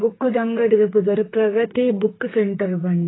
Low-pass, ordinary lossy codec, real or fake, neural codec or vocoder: 7.2 kHz; AAC, 16 kbps; fake; codec, 32 kHz, 1.9 kbps, SNAC